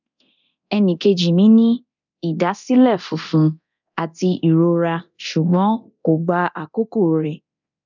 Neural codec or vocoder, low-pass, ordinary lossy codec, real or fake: codec, 24 kHz, 0.9 kbps, DualCodec; 7.2 kHz; none; fake